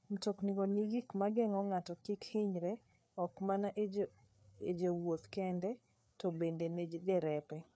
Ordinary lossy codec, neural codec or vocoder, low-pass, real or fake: none; codec, 16 kHz, 4 kbps, FreqCodec, larger model; none; fake